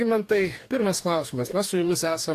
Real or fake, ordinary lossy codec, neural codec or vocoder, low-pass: fake; AAC, 64 kbps; codec, 44.1 kHz, 2.6 kbps, DAC; 14.4 kHz